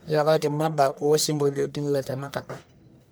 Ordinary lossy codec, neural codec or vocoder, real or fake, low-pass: none; codec, 44.1 kHz, 1.7 kbps, Pupu-Codec; fake; none